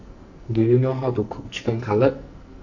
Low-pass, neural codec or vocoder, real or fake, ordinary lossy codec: 7.2 kHz; codec, 44.1 kHz, 2.6 kbps, SNAC; fake; none